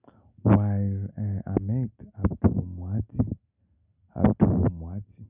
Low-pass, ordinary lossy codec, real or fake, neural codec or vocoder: 3.6 kHz; none; real; none